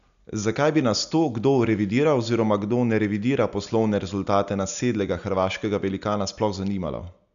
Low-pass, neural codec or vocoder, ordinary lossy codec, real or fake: 7.2 kHz; none; MP3, 96 kbps; real